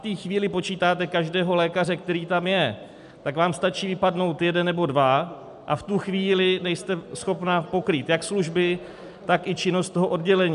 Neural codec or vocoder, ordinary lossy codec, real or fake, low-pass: none; MP3, 96 kbps; real; 10.8 kHz